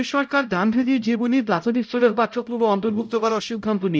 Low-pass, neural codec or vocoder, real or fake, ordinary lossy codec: none; codec, 16 kHz, 0.5 kbps, X-Codec, HuBERT features, trained on LibriSpeech; fake; none